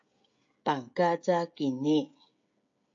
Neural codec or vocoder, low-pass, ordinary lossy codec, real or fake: codec, 16 kHz, 16 kbps, FreqCodec, smaller model; 7.2 kHz; AAC, 64 kbps; fake